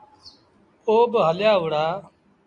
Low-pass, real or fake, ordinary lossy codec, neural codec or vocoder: 10.8 kHz; real; AAC, 48 kbps; none